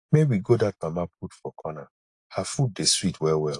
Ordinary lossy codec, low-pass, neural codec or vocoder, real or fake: AAC, 48 kbps; 10.8 kHz; none; real